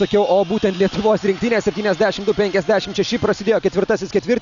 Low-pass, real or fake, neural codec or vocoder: 7.2 kHz; real; none